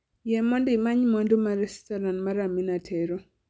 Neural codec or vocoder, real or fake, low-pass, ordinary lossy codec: none; real; none; none